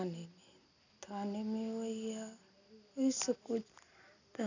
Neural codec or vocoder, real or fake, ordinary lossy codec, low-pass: none; real; none; 7.2 kHz